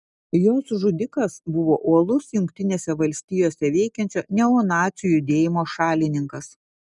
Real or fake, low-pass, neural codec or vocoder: real; 10.8 kHz; none